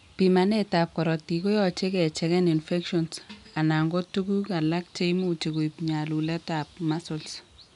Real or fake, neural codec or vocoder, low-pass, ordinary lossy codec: real; none; 10.8 kHz; none